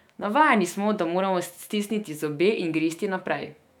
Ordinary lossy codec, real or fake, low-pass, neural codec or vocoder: none; fake; 19.8 kHz; autoencoder, 48 kHz, 128 numbers a frame, DAC-VAE, trained on Japanese speech